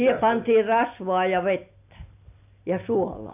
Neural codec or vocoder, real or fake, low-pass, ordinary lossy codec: none; real; 3.6 kHz; AAC, 32 kbps